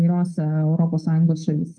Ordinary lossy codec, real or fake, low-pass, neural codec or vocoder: Opus, 32 kbps; fake; 9.9 kHz; codec, 24 kHz, 3.1 kbps, DualCodec